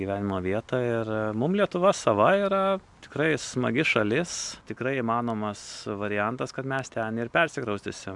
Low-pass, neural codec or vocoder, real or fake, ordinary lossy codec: 10.8 kHz; none; real; MP3, 96 kbps